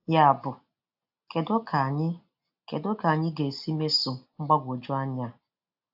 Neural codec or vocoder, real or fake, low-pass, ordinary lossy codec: none; real; 5.4 kHz; AAC, 48 kbps